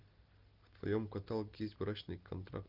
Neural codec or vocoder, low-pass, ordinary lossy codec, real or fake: none; 5.4 kHz; Opus, 24 kbps; real